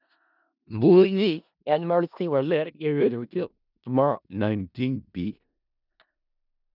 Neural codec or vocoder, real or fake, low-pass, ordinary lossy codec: codec, 16 kHz in and 24 kHz out, 0.4 kbps, LongCat-Audio-Codec, four codebook decoder; fake; 5.4 kHz; none